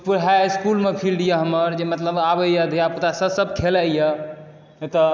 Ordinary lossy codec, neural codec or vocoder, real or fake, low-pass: none; none; real; none